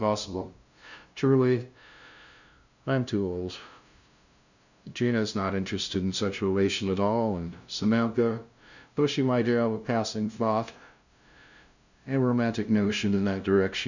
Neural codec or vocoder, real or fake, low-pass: codec, 16 kHz, 0.5 kbps, FunCodec, trained on LibriTTS, 25 frames a second; fake; 7.2 kHz